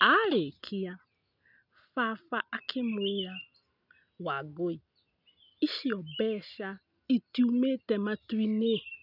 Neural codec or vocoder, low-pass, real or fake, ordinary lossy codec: none; 5.4 kHz; real; none